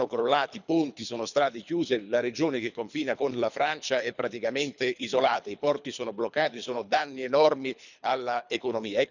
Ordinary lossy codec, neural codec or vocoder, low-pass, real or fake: none; codec, 24 kHz, 3 kbps, HILCodec; 7.2 kHz; fake